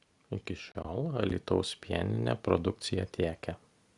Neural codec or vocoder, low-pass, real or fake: none; 10.8 kHz; real